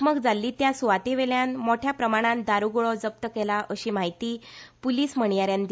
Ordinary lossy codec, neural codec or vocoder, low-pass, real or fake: none; none; none; real